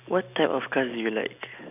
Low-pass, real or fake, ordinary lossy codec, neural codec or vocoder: 3.6 kHz; real; none; none